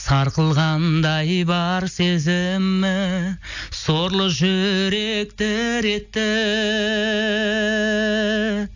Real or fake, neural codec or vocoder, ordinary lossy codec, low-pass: real; none; none; 7.2 kHz